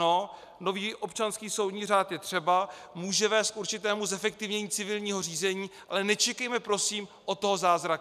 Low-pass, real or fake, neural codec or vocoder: 14.4 kHz; real; none